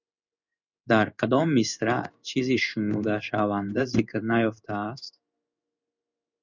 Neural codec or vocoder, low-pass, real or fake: codec, 16 kHz in and 24 kHz out, 1 kbps, XY-Tokenizer; 7.2 kHz; fake